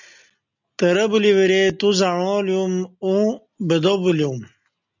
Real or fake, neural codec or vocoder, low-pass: real; none; 7.2 kHz